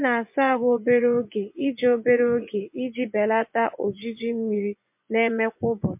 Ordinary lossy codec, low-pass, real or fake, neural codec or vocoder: MP3, 32 kbps; 3.6 kHz; fake; vocoder, 24 kHz, 100 mel bands, Vocos